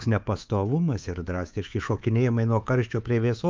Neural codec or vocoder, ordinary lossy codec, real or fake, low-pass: codec, 24 kHz, 3.1 kbps, DualCodec; Opus, 24 kbps; fake; 7.2 kHz